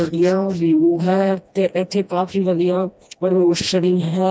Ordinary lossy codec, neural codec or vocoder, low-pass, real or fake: none; codec, 16 kHz, 1 kbps, FreqCodec, smaller model; none; fake